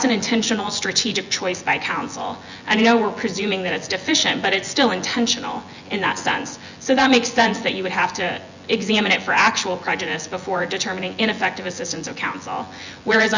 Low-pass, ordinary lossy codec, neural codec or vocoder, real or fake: 7.2 kHz; Opus, 64 kbps; vocoder, 24 kHz, 100 mel bands, Vocos; fake